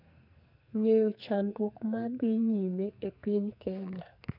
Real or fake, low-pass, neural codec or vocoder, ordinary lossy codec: fake; 5.4 kHz; codec, 44.1 kHz, 2.6 kbps, SNAC; none